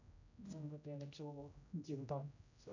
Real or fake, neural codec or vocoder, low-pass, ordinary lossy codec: fake; codec, 16 kHz, 0.5 kbps, X-Codec, HuBERT features, trained on general audio; 7.2 kHz; none